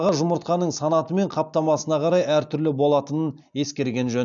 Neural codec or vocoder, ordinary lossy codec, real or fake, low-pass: none; none; real; 7.2 kHz